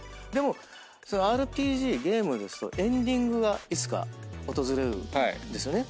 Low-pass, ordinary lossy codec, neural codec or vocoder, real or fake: none; none; none; real